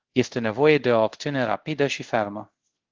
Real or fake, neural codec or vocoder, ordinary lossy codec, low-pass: fake; codec, 24 kHz, 0.5 kbps, DualCodec; Opus, 16 kbps; 7.2 kHz